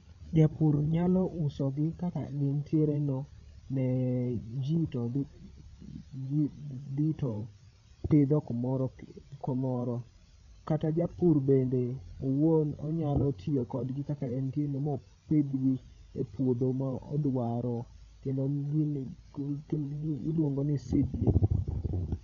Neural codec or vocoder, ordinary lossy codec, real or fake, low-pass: codec, 16 kHz, 16 kbps, FreqCodec, larger model; none; fake; 7.2 kHz